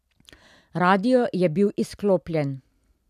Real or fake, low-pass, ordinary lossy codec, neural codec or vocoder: real; 14.4 kHz; none; none